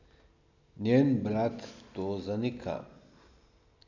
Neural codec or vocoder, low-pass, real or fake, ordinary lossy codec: none; 7.2 kHz; real; AAC, 48 kbps